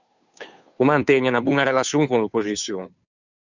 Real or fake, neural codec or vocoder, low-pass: fake; codec, 16 kHz, 2 kbps, FunCodec, trained on Chinese and English, 25 frames a second; 7.2 kHz